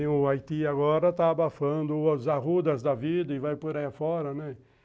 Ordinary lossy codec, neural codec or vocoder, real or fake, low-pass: none; none; real; none